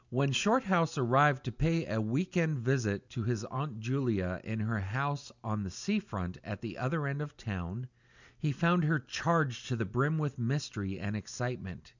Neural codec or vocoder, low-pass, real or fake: none; 7.2 kHz; real